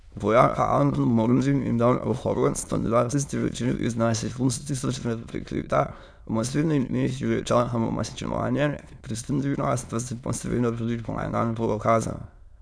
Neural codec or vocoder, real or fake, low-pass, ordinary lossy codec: autoencoder, 22.05 kHz, a latent of 192 numbers a frame, VITS, trained on many speakers; fake; none; none